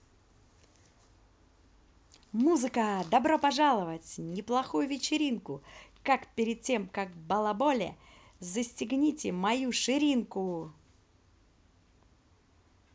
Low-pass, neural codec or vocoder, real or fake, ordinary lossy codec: none; none; real; none